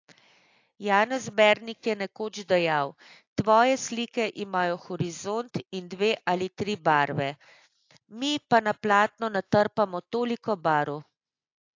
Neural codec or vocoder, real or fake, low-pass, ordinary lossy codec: none; real; 7.2 kHz; AAC, 48 kbps